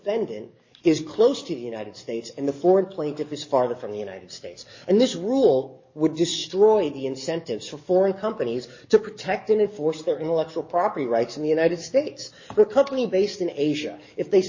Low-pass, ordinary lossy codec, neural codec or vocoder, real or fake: 7.2 kHz; MP3, 32 kbps; codec, 44.1 kHz, 7.8 kbps, DAC; fake